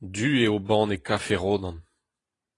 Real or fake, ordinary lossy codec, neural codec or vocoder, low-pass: fake; AAC, 32 kbps; vocoder, 44.1 kHz, 128 mel bands every 512 samples, BigVGAN v2; 10.8 kHz